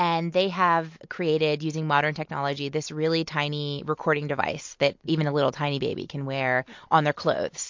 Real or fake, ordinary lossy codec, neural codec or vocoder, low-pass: real; MP3, 48 kbps; none; 7.2 kHz